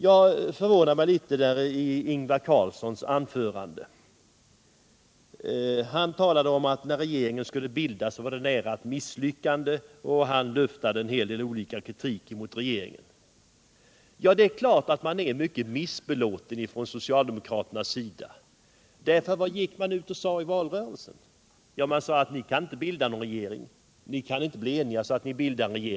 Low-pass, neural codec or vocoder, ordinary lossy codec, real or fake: none; none; none; real